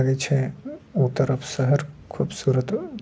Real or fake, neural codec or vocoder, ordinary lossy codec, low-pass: real; none; none; none